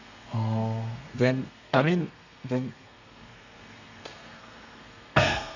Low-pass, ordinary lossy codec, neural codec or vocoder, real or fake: 7.2 kHz; none; codec, 32 kHz, 1.9 kbps, SNAC; fake